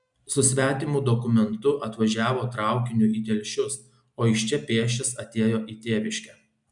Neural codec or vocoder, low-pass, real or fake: none; 10.8 kHz; real